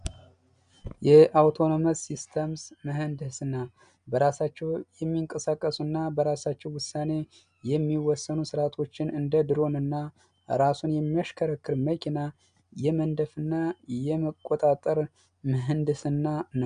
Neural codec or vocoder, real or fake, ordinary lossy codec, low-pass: none; real; MP3, 96 kbps; 9.9 kHz